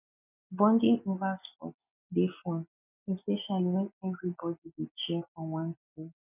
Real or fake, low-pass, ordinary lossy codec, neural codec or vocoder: real; 3.6 kHz; none; none